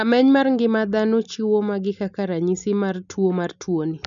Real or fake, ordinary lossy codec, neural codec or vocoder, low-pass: real; none; none; 7.2 kHz